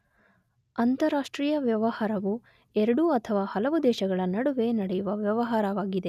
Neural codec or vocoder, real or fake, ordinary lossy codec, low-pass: none; real; none; 14.4 kHz